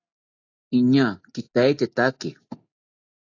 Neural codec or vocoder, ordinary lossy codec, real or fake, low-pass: none; AAC, 48 kbps; real; 7.2 kHz